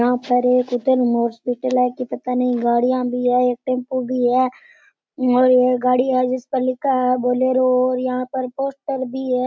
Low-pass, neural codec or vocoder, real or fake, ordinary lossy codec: none; none; real; none